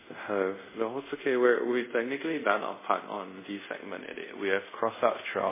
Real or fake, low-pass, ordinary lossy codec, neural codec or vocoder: fake; 3.6 kHz; MP3, 16 kbps; codec, 24 kHz, 0.5 kbps, DualCodec